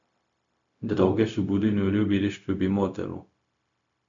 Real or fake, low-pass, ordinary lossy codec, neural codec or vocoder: fake; 7.2 kHz; MP3, 64 kbps; codec, 16 kHz, 0.4 kbps, LongCat-Audio-Codec